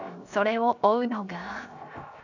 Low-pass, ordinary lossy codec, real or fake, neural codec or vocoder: 7.2 kHz; none; fake; codec, 16 kHz, 0.7 kbps, FocalCodec